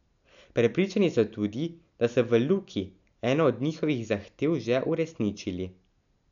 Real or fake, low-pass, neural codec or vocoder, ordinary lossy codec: real; 7.2 kHz; none; none